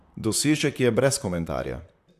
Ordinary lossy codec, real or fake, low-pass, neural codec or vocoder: AAC, 96 kbps; fake; 14.4 kHz; vocoder, 44.1 kHz, 128 mel bands, Pupu-Vocoder